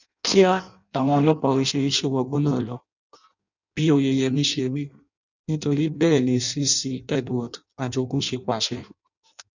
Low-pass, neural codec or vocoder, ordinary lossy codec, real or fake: 7.2 kHz; codec, 16 kHz in and 24 kHz out, 0.6 kbps, FireRedTTS-2 codec; none; fake